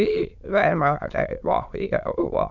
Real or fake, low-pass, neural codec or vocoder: fake; 7.2 kHz; autoencoder, 22.05 kHz, a latent of 192 numbers a frame, VITS, trained on many speakers